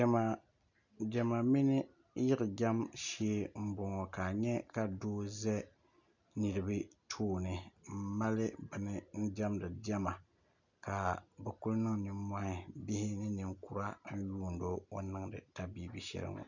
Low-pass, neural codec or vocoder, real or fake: 7.2 kHz; none; real